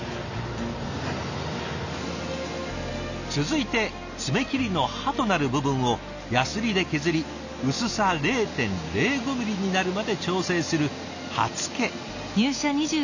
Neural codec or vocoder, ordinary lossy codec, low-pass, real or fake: none; none; 7.2 kHz; real